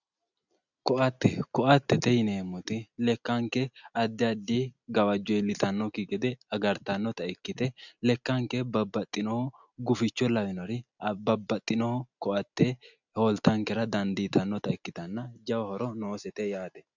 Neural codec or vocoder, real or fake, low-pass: none; real; 7.2 kHz